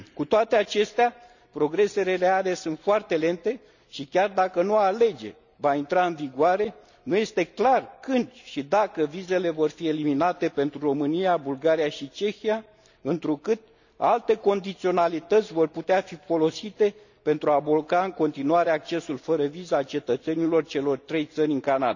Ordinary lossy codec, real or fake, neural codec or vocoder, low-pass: none; real; none; 7.2 kHz